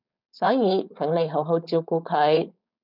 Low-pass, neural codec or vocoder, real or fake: 5.4 kHz; codec, 16 kHz, 4.8 kbps, FACodec; fake